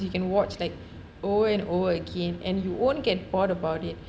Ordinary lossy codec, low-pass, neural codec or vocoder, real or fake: none; none; none; real